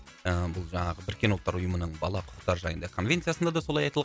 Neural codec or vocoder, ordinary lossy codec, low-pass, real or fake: none; none; none; real